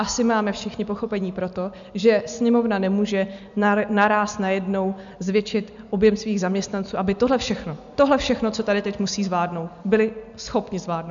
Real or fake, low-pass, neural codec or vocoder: real; 7.2 kHz; none